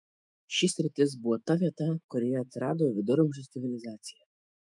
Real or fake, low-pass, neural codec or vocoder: fake; 10.8 kHz; autoencoder, 48 kHz, 128 numbers a frame, DAC-VAE, trained on Japanese speech